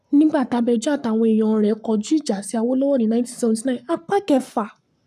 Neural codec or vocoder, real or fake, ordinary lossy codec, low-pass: codec, 44.1 kHz, 7.8 kbps, Pupu-Codec; fake; none; 14.4 kHz